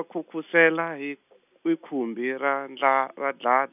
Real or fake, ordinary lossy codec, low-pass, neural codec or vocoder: real; none; 3.6 kHz; none